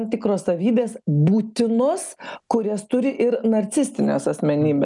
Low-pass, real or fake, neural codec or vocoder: 10.8 kHz; real; none